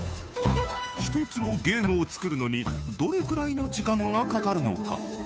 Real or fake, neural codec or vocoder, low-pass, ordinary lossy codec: fake; codec, 16 kHz, 2 kbps, FunCodec, trained on Chinese and English, 25 frames a second; none; none